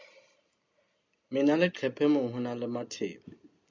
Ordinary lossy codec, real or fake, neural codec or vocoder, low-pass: MP3, 64 kbps; real; none; 7.2 kHz